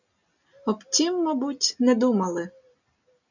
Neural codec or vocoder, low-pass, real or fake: none; 7.2 kHz; real